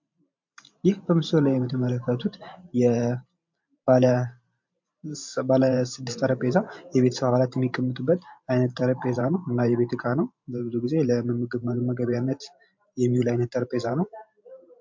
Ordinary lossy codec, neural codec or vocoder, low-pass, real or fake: MP3, 48 kbps; vocoder, 44.1 kHz, 128 mel bands every 512 samples, BigVGAN v2; 7.2 kHz; fake